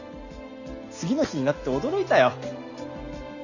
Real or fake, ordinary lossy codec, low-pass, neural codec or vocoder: real; none; 7.2 kHz; none